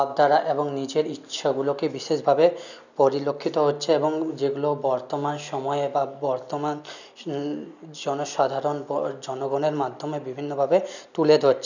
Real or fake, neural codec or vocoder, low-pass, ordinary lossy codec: real; none; 7.2 kHz; none